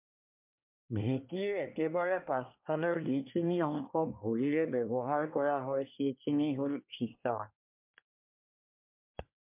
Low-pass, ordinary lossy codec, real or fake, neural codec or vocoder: 3.6 kHz; MP3, 32 kbps; fake; codec, 24 kHz, 1 kbps, SNAC